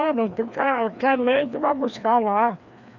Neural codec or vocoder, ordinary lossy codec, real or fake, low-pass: codec, 16 kHz, 2 kbps, FreqCodec, larger model; none; fake; 7.2 kHz